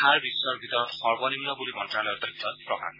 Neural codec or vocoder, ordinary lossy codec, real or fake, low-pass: none; MP3, 24 kbps; real; 5.4 kHz